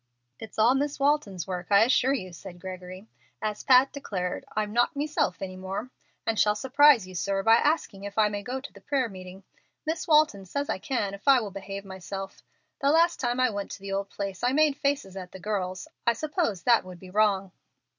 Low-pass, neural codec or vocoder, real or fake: 7.2 kHz; none; real